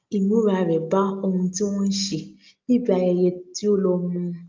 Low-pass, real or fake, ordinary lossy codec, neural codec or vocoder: 7.2 kHz; real; Opus, 24 kbps; none